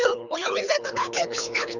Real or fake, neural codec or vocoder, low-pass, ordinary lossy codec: fake; codec, 24 kHz, 3 kbps, HILCodec; 7.2 kHz; none